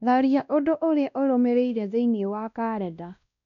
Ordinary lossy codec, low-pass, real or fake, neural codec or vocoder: none; 7.2 kHz; fake; codec, 16 kHz, 1 kbps, X-Codec, WavLM features, trained on Multilingual LibriSpeech